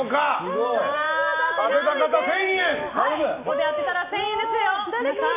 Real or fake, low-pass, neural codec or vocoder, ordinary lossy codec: real; 3.6 kHz; none; MP3, 24 kbps